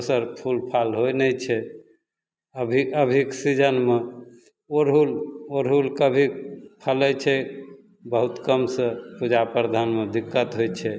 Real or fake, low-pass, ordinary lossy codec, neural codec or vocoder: real; none; none; none